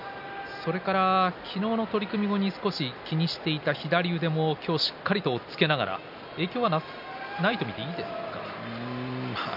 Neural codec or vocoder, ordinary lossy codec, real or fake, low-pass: none; none; real; 5.4 kHz